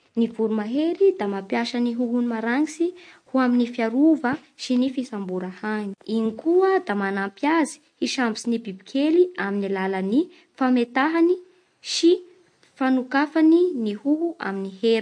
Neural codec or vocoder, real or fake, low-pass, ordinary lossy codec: none; real; 9.9 kHz; MP3, 48 kbps